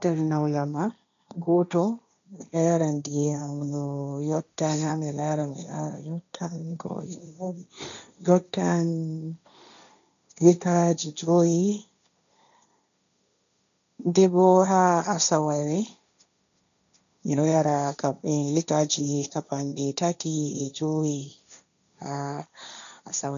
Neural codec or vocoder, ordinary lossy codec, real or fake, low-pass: codec, 16 kHz, 1.1 kbps, Voila-Tokenizer; none; fake; 7.2 kHz